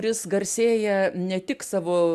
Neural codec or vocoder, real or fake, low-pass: none; real; 14.4 kHz